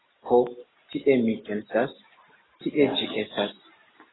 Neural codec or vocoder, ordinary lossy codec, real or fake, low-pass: none; AAC, 16 kbps; real; 7.2 kHz